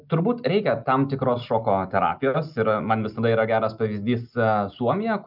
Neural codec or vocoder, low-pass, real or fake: none; 5.4 kHz; real